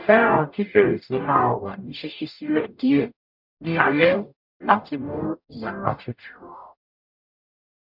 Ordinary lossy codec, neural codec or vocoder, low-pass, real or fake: none; codec, 44.1 kHz, 0.9 kbps, DAC; 5.4 kHz; fake